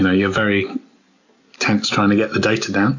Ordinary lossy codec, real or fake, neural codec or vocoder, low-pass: AAC, 48 kbps; real; none; 7.2 kHz